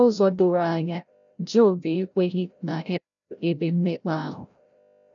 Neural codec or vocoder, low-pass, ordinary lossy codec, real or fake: codec, 16 kHz, 0.5 kbps, FreqCodec, larger model; 7.2 kHz; none; fake